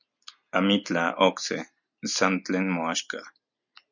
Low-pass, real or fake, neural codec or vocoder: 7.2 kHz; real; none